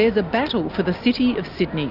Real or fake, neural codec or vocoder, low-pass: real; none; 5.4 kHz